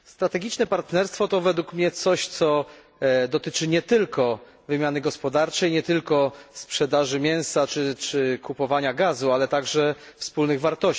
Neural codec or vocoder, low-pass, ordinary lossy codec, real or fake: none; none; none; real